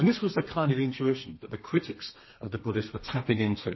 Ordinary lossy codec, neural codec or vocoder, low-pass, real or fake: MP3, 24 kbps; codec, 32 kHz, 1.9 kbps, SNAC; 7.2 kHz; fake